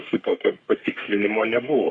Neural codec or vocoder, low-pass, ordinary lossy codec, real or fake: codec, 44.1 kHz, 3.4 kbps, Pupu-Codec; 9.9 kHz; Opus, 64 kbps; fake